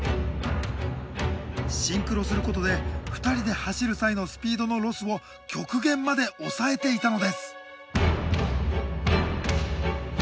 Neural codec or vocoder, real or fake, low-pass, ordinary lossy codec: none; real; none; none